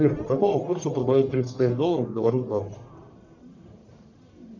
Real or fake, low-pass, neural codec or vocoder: fake; 7.2 kHz; codec, 44.1 kHz, 1.7 kbps, Pupu-Codec